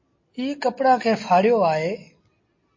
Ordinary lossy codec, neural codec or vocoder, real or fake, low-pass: MP3, 32 kbps; none; real; 7.2 kHz